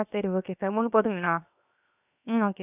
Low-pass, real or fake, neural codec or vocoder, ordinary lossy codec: 3.6 kHz; fake; codec, 16 kHz, 0.7 kbps, FocalCodec; none